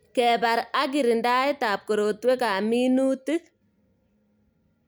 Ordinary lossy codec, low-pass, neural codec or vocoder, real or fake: none; none; none; real